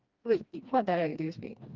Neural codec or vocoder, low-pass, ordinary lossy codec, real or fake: codec, 16 kHz, 2 kbps, FreqCodec, smaller model; 7.2 kHz; Opus, 32 kbps; fake